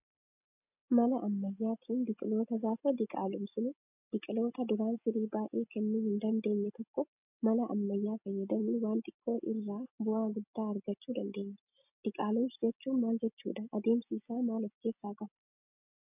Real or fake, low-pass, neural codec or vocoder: real; 3.6 kHz; none